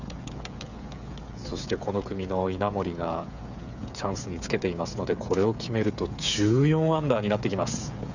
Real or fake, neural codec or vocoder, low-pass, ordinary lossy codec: fake; codec, 16 kHz, 8 kbps, FreqCodec, smaller model; 7.2 kHz; none